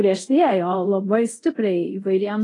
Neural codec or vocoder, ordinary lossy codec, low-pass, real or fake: codec, 24 kHz, 0.5 kbps, DualCodec; AAC, 32 kbps; 10.8 kHz; fake